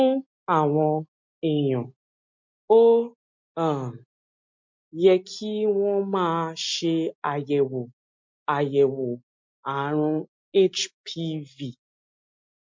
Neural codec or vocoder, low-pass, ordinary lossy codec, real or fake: none; 7.2 kHz; MP3, 48 kbps; real